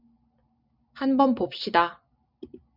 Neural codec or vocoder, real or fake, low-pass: none; real; 5.4 kHz